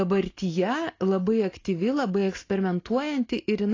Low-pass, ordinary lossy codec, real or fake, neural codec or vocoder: 7.2 kHz; AAC, 32 kbps; real; none